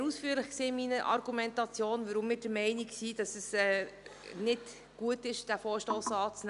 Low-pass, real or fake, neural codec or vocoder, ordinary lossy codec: 10.8 kHz; real; none; none